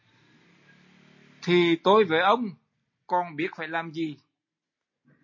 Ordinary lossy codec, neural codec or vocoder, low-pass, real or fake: MP3, 32 kbps; none; 7.2 kHz; real